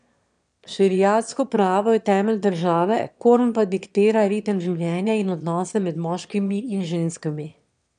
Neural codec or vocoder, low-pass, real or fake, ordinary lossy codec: autoencoder, 22.05 kHz, a latent of 192 numbers a frame, VITS, trained on one speaker; 9.9 kHz; fake; none